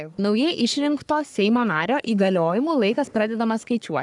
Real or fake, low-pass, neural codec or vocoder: fake; 10.8 kHz; codec, 44.1 kHz, 3.4 kbps, Pupu-Codec